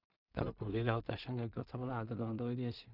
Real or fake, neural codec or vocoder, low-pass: fake; codec, 16 kHz in and 24 kHz out, 0.4 kbps, LongCat-Audio-Codec, two codebook decoder; 5.4 kHz